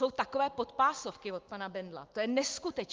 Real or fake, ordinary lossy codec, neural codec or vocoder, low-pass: real; Opus, 24 kbps; none; 7.2 kHz